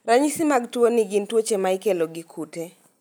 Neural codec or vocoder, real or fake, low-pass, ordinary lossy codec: none; real; none; none